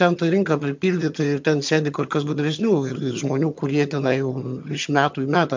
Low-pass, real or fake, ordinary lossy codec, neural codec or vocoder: 7.2 kHz; fake; MP3, 64 kbps; vocoder, 22.05 kHz, 80 mel bands, HiFi-GAN